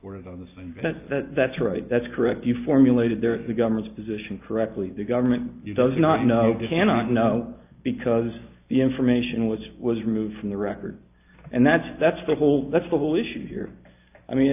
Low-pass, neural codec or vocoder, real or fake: 3.6 kHz; none; real